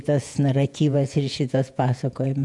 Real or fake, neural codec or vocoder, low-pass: fake; vocoder, 48 kHz, 128 mel bands, Vocos; 10.8 kHz